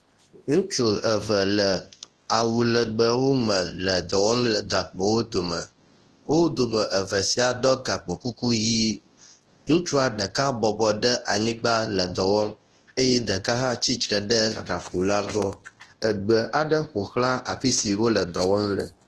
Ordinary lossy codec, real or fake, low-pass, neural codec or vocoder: Opus, 16 kbps; fake; 10.8 kHz; codec, 24 kHz, 0.9 kbps, WavTokenizer, large speech release